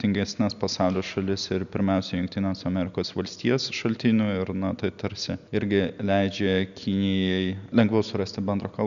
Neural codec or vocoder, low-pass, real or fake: none; 7.2 kHz; real